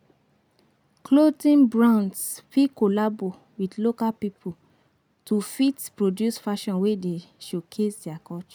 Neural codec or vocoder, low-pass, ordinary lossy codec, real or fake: none; none; none; real